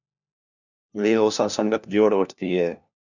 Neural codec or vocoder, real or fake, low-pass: codec, 16 kHz, 1 kbps, FunCodec, trained on LibriTTS, 50 frames a second; fake; 7.2 kHz